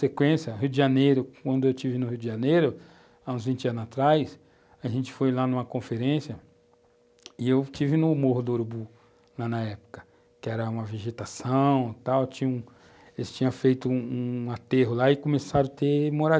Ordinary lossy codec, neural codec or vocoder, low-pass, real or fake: none; none; none; real